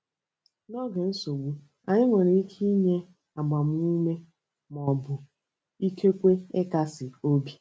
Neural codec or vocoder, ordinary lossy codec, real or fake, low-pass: none; none; real; none